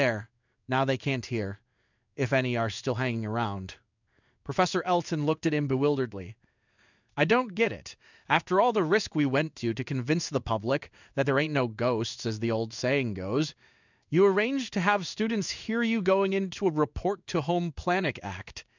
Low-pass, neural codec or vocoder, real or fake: 7.2 kHz; codec, 16 kHz in and 24 kHz out, 1 kbps, XY-Tokenizer; fake